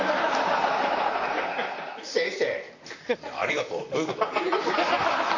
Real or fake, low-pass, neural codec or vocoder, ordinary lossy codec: fake; 7.2 kHz; vocoder, 44.1 kHz, 128 mel bands, Pupu-Vocoder; AAC, 48 kbps